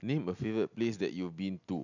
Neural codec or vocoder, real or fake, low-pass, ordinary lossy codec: none; real; 7.2 kHz; none